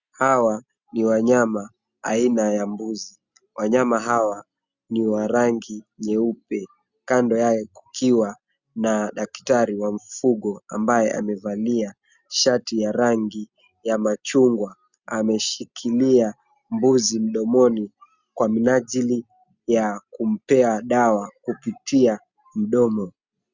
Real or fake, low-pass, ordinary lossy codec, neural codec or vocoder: real; 7.2 kHz; Opus, 64 kbps; none